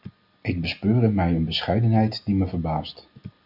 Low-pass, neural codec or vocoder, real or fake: 5.4 kHz; none; real